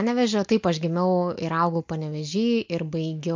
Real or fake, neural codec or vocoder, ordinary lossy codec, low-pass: real; none; MP3, 48 kbps; 7.2 kHz